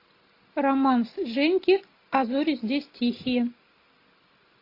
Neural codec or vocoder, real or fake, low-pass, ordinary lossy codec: none; real; 5.4 kHz; AAC, 32 kbps